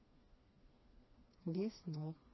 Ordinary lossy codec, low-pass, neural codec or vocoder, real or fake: MP3, 24 kbps; 7.2 kHz; codec, 16 kHz, 2 kbps, FreqCodec, smaller model; fake